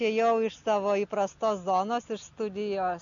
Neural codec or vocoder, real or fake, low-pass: none; real; 7.2 kHz